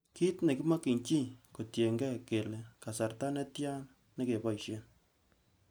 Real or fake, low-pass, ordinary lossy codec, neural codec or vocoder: real; none; none; none